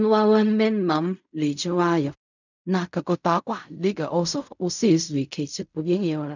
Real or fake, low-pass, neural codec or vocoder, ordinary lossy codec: fake; 7.2 kHz; codec, 16 kHz in and 24 kHz out, 0.4 kbps, LongCat-Audio-Codec, fine tuned four codebook decoder; none